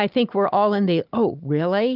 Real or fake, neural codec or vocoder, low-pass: real; none; 5.4 kHz